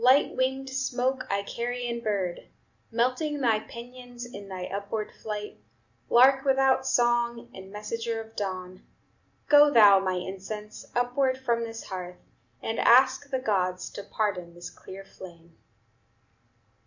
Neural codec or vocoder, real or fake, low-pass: none; real; 7.2 kHz